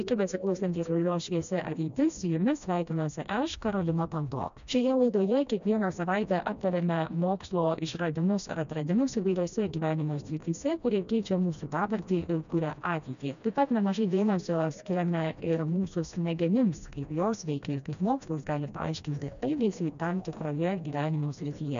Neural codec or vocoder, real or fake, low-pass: codec, 16 kHz, 1 kbps, FreqCodec, smaller model; fake; 7.2 kHz